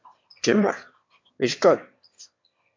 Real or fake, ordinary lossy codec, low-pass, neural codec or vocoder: fake; MP3, 48 kbps; 7.2 kHz; autoencoder, 22.05 kHz, a latent of 192 numbers a frame, VITS, trained on one speaker